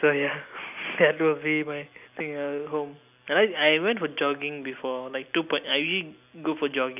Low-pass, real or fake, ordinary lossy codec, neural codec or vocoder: 3.6 kHz; real; none; none